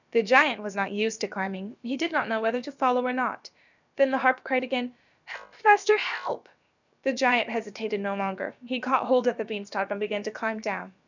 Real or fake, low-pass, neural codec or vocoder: fake; 7.2 kHz; codec, 16 kHz, about 1 kbps, DyCAST, with the encoder's durations